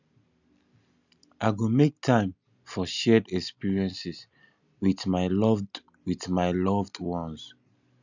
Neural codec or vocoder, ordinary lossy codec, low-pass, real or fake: none; none; 7.2 kHz; real